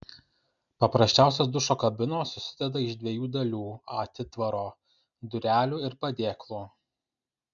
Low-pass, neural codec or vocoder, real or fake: 7.2 kHz; none; real